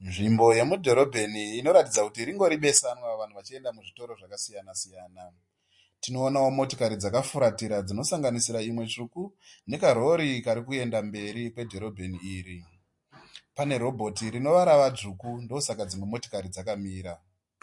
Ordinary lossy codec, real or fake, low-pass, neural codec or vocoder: MP3, 48 kbps; real; 10.8 kHz; none